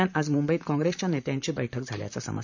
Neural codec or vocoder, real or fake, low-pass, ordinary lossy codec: vocoder, 44.1 kHz, 128 mel bands, Pupu-Vocoder; fake; 7.2 kHz; none